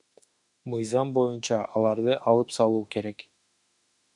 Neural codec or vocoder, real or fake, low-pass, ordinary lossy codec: autoencoder, 48 kHz, 32 numbers a frame, DAC-VAE, trained on Japanese speech; fake; 10.8 kHz; MP3, 96 kbps